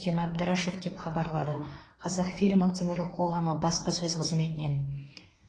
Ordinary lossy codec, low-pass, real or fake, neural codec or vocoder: AAC, 32 kbps; 9.9 kHz; fake; codec, 24 kHz, 1 kbps, SNAC